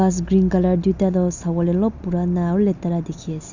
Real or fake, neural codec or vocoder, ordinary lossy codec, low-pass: real; none; none; 7.2 kHz